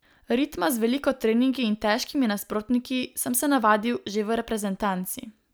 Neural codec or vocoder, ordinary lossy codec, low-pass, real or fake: none; none; none; real